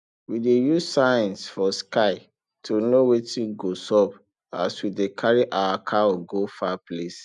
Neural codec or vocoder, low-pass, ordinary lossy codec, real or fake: none; 10.8 kHz; none; real